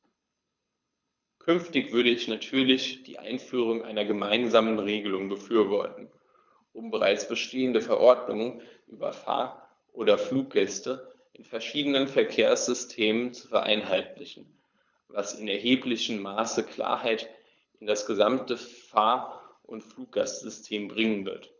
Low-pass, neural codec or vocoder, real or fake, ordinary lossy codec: 7.2 kHz; codec, 24 kHz, 6 kbps, HILCodec; fake; none